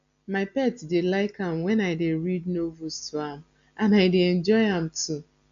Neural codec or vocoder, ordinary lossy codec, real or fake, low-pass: none; MP3, 96 kbps; real; 7.2 kHz